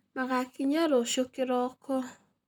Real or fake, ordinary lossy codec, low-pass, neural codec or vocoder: fake; none; none; vocoder, 44.1 kHz, 128 mel bands, Pupu-Vocoder